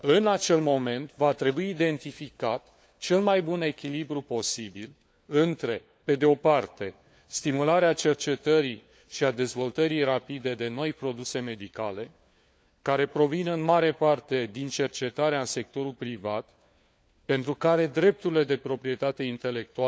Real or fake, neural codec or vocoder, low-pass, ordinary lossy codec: fake; codec, 16 kHz, 4 kbps, FunCodec, trained on LibriTTS, 50 frames a second; none; none